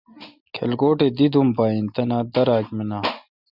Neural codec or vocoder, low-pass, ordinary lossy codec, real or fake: none; 5.4 kHz; Opus, 64 kbps; real